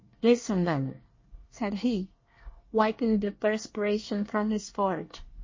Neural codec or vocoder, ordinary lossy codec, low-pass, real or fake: codec, 24 kHz, 1 kbps, SNAC; MP3, 32 kbps; 7.2 kHz; fake